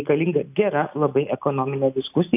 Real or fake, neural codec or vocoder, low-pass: real; none; 3.6 kHz